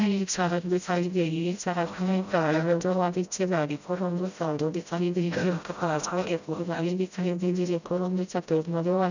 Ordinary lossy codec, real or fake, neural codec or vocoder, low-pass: none; fake; codec, 16 kHz, 0.5 kbps, FreqCodec, smaller model; 7.2 kHz